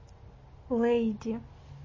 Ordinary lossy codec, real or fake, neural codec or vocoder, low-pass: MP3, 32 kbps; real; none; 7.2 kHz